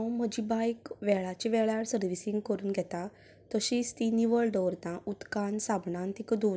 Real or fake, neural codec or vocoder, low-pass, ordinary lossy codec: real; none; none; none